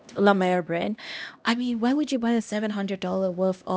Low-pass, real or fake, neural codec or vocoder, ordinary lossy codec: none; fake; codec, 16 kHz, 1 kbps, X-Codec, HuBERT features, trained on LibriSpeech; none